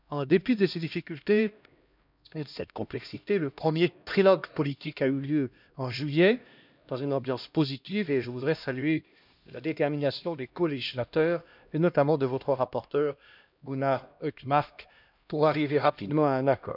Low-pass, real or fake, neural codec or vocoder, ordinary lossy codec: 5.4 kHz; fake; codec, 16 kHz, 1 kbps, X-Codec, HuBERT features, trained on LibriSpeech; none